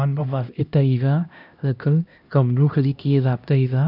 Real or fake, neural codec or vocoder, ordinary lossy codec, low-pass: fake; codec, 16 kHz, 1 kbps, X-Codec, HuBERT features, trained on LibriSpeech; none; 5.4 kHz